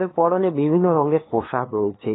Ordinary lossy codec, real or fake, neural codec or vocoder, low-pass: AAC, 16 kbps; fake; codec, 16 kHz, 2 kbps, FunCodec, trained on LibriTTS, 25 frames a second; 7.2 kHz